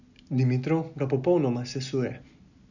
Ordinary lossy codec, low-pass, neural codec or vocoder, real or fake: none; 7.2 kHz; none; real